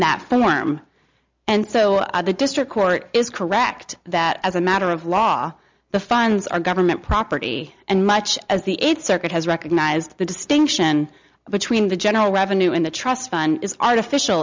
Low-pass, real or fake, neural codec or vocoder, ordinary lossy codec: 7.2 kHz; real; none; MP3, 64 kbps